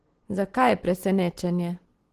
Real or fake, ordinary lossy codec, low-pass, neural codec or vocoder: real; Opus, 16 kbps; 14.4 kHz; none